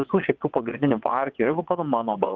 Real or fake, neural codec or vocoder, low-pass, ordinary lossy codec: fake; vocoder, 22.05 kHz, 80 mel bands, WaveNeXt; 7.2 kHz; Opus, 32 kbps